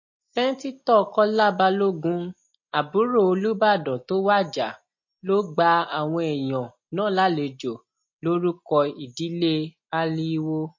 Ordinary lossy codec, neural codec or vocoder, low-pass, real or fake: MP3, 32 kbps; none; 7.2 kHz; real